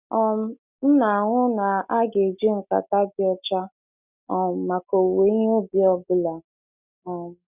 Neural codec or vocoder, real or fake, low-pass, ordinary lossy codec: none; real; 3.6 kHz; none